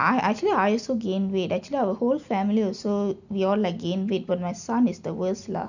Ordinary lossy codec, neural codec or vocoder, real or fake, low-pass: none; none; real; 7.2 kHz